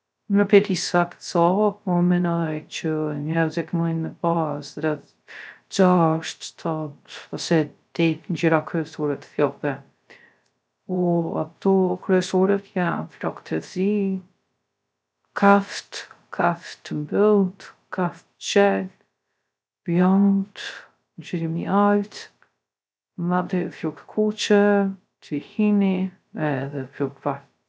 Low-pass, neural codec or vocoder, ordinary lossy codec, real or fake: none; codec, 16 kHz, 0.3 kbps, FocalCodec; none; fake